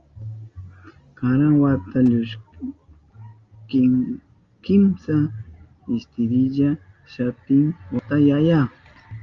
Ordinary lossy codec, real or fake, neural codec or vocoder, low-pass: Opus, 32 kbps; real; none; 7.2 kHz